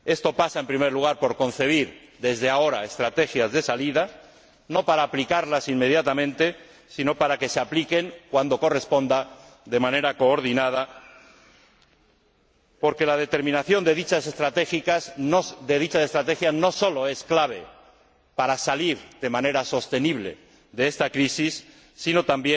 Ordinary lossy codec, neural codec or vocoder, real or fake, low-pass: none; none; real; none